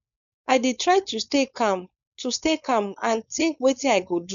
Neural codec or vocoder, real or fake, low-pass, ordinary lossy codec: codec, 16 kHz, 4.8 kbps, FACodec; fake; 7.2 kHz; none